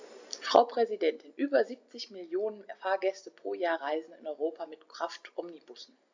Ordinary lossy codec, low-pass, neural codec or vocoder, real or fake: AAC, 48 kbps; 7.2 kHz; none; real